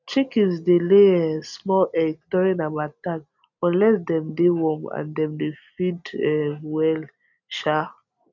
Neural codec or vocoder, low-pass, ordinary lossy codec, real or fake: none; 7.2 kHz; none; real